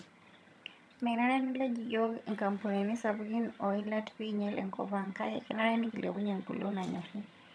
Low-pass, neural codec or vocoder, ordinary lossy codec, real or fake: none; vocoder, 22.05 kHz, 80 mel bands, HiFi-GAN; none; fake